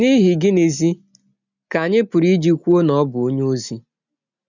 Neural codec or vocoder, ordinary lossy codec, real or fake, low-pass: none; none; real; 7.2 kHz